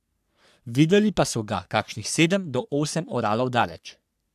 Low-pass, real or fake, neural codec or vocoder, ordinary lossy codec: 14.4 kHz; fake; codec, 44.1 kHz, 3.4 kbps, Pupu-Codec; AAC, 96 kbps